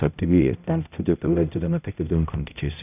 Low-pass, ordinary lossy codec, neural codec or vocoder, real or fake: 3.6 kHz; none; codec, 16 kHz, 0.5 kbps, X-Codec, HuBERT features, trained on balanced general audio; fake